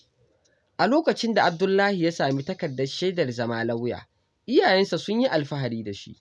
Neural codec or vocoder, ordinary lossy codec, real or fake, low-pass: none; none; real; none